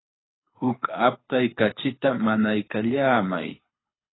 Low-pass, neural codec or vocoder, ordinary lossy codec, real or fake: 7.2 kHz; codec, 24 kHz, 6 kbps, HILCodec; AAC, 16 kbps; fake